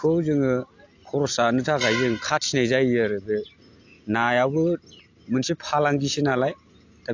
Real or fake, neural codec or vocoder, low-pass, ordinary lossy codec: real; none; 7.2 kHz; AAC, 48 kbps